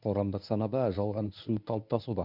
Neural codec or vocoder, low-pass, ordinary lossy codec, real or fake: codec, 24 kHz, 0.9 kbps, WavTokenizer, medium speech release version 1; 5.4 kHz; none; fake